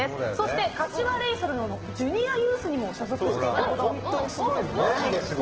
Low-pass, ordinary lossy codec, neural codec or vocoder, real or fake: 7.2 kHz; Opus, 16 kbps; none; real